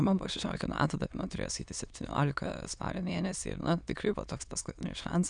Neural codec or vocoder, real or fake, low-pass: autoencoder, 22.05 kHz, a latent of 192 numbers a frame, VITS, trained on many speakers; fake; 9.9 kHz